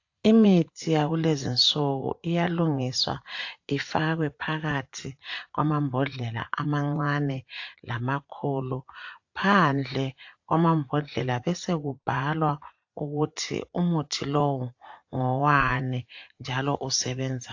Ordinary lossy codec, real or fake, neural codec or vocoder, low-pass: AAC, 48 kbps; fake; vocoder, 44.1 kHz, 80 mel bands, Vocos; 7.2 kHz